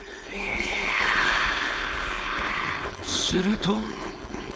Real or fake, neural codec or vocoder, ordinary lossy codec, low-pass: fake; codec, 16 kHz, 4.8 kbps, FACodec; none; none